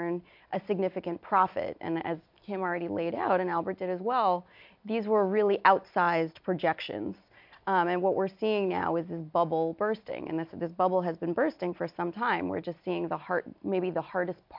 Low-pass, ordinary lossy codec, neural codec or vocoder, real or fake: 5.4 kHz; MP3, 48 kbps; none; real